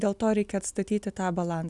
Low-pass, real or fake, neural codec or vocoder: 10.8 kHz; real; none